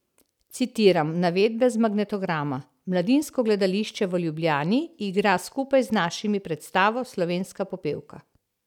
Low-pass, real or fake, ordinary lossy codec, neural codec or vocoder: 19.8 kHz; real; none; none